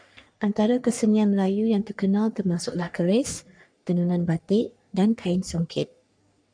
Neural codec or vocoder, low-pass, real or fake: codec, 44.1 kHz, 3.4 kbps, Pupu-Codec; 9.9 kHz; fake